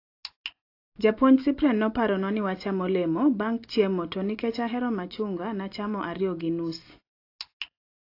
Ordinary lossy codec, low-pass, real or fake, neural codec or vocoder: AAC, 32 kbps; 5.4 kHz; real; none